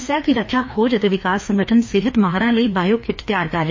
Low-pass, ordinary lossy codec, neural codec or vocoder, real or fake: 7.2 kHz; MP3, 32 kbps; codec, 16 kHz, 2 kbps, FreqCodec, larger model; fake